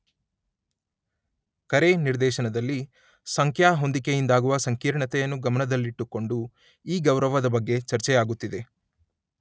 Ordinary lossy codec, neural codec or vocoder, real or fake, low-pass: none; none; real; none